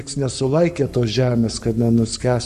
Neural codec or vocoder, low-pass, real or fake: codec, 44.1 kHz, 7.8 kbps, Pupu-Codec; 14.4 kHz; fake